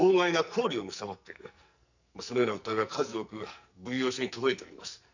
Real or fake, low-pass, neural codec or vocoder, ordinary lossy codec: fake; 7.2 kHz; codec, 44.1 kHz, 2.6 kbps, SNAC; none